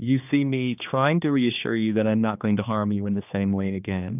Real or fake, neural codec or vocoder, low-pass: fake; codec, 16 kHz, 2 kbps, X-Codec, HuBERT features, trained on general audio; 3.6 kHz